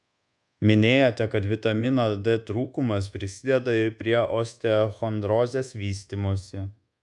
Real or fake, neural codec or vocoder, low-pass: fake; codec, 24 kHz, 1.2 kbps, DualCodec; 10.8 kHz